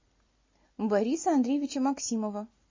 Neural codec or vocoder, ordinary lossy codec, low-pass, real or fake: none; MP3, 32 kbps; 7.2 kHz; real